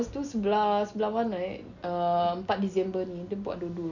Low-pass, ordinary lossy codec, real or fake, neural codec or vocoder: 7.2 kHz; none; real; none